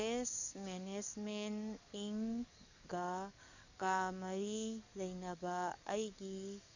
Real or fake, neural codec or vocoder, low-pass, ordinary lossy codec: fake; codec, 44.1 kHz, 7.8 kbps, DAC; 7.2 kHz; none